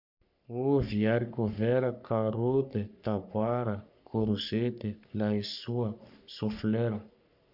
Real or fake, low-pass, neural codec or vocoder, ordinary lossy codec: fake; 5.4 kHz; codec, 44.1 kHz, 3.4 kbps, Pupu-Codec; none